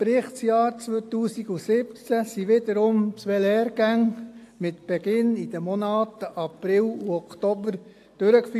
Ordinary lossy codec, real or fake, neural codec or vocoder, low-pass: AAC, 64 kbps; real; none; 14.4 kHz